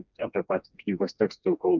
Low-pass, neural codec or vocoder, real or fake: 7.2 kHz; codec, 16 kHz, 2 kbps, FreqCodec, smaller model; fake